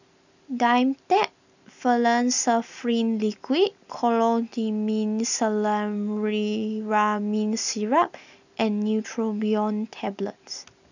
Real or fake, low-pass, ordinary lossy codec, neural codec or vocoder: real; 7.2 kHz; none; none